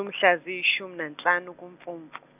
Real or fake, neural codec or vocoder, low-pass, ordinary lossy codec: real; none; 3.6 kHz; none